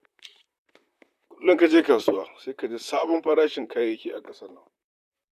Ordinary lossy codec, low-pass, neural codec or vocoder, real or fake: none; 14.4 kHz; vocoder, 44.1 kHz, 128 mel bands, Pupu-Vocoder; fake